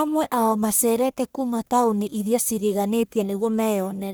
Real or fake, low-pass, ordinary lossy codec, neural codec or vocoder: fake; none; none; codec, 44.1 kHz, 1.7 kbps, Pupu-Codec